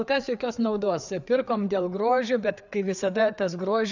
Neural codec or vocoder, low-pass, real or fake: codec, 16 kHz, 4 kbps, FreqCodec, larger model; 7.2 kHz; fake